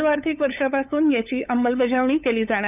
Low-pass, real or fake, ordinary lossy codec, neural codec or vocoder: 3.6 kHz; fake; none; codec, 16 kHz, 8 kbps, FunCodec, trained on LibriTTS, 25 frames a second